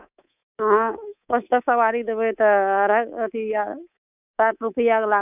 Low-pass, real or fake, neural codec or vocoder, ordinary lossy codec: 3.6 kHz; fake; codec, 24 kHz, 3.1 kbps, DualCodec; none